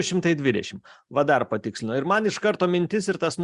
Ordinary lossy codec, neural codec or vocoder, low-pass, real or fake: Opus, 64 kbps; none; 10.8 kHz; real